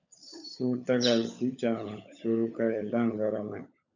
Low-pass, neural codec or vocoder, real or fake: 7.2 kHz; codec, 16 kHz, 16 kbps, FunCodec, trained on LibriTTS, 50 frames a second; fake